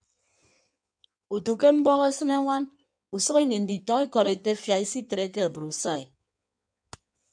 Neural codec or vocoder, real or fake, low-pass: codec, 16 kHz in and 24 kHz out, 1.1 kbps, FireRedTTS-2 codec; fake; 9.9 kHz